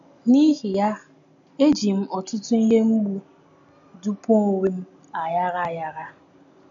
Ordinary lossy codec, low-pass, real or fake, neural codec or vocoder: none; 7.2 kHz; real; none